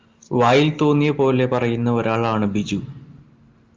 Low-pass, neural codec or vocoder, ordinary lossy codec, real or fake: 7.2 kHz; none; Opus, 24 kbps; real